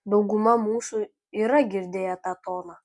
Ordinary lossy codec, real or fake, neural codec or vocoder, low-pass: MP3, 64 kbps; real; none; 10.8 kHz